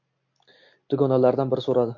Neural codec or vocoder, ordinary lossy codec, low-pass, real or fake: none; MP3, 48 kbps; 7.2 kHz; real